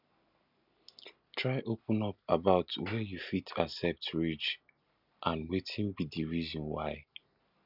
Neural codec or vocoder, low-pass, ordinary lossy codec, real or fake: none; 5.4 kHz; none; real